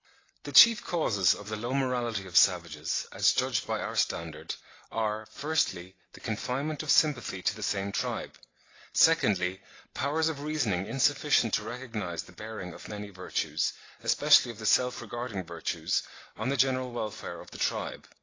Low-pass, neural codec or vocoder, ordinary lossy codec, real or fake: 7.2 kHz; none; AAC, 32 kbps; real